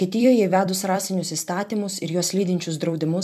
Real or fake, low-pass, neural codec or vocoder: fake; 14.4 kHz; vocoder, 44.1 kHz, 128 mel bands every 256 samples, BigVGAN v2